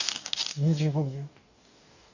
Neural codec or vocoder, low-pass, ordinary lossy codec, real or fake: codec, 44.1 kHz, 2.6 kbps, DAC; 7.2 kHz; none; fake